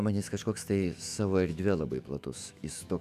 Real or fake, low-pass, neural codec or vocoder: fake; 14.4 kHz; autoencoder, 48 kHz, 128 numbers a frame, DAC-VAE, trained on Japanese speech